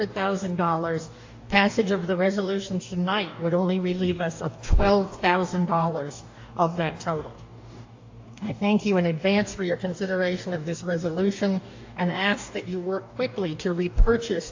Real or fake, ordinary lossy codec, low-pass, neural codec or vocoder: fake; AAC, 48 kbps; 7.2 kHz; codec, 44.1 kHz, 2.6 kbps, DAC